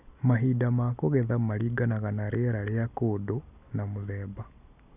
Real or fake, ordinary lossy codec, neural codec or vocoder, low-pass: real; none; none; 3.6 kHz